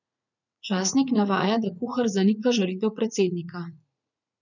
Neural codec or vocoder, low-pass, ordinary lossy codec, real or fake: vocoder, 44.1 kHz, 80 mel bands, Vocos; 7.2 kHz; none; fake